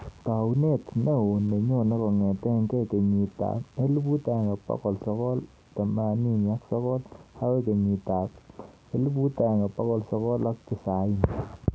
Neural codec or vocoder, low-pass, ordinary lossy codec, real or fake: none; none; none; real